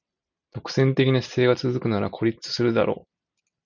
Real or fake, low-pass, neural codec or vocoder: real; 7.2 kHz; none